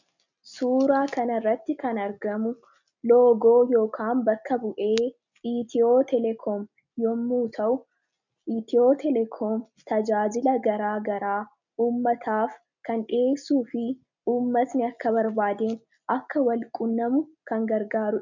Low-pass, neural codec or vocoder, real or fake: 7.2 kHz; none; real